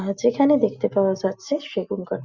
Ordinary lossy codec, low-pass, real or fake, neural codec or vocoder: Opus, 64 kbps; 7.2 kHz; real; none